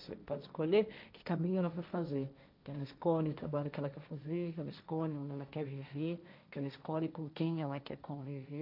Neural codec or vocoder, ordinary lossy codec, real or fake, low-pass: codec, 16 kHz, 1.1 kbps, Voila-Tokenizer; none; fake; 5.4 kHz